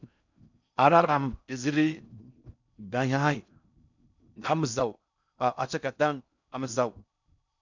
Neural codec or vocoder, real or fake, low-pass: codec, 16 kHz in and 24 kHz out, 0.6 kbps, FocalCodec, streaming, 4096 codes; fake; 7.2 kHz